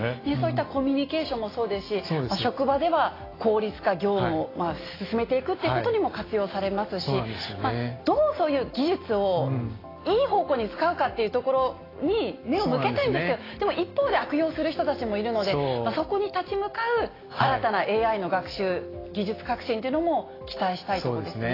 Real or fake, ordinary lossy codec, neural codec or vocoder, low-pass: real; AAC, 24 kbps; none; 5.4 kHz